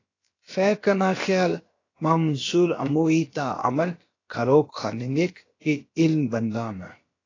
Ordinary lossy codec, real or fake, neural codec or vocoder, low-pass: AAC, 32 kbps; fake; codec, 16 kHz, about 1 kbps, DyCAST, with the encoder's durations; 7.2 kHz